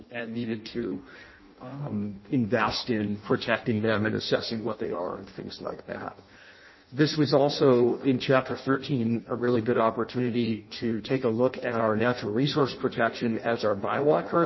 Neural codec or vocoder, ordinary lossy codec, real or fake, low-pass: codec, 16 kHz in and 24 kHz out, 0.6 kbps, FireRedTTS-2 codec; MP3, 24 kbps; fake; 7.2 kHz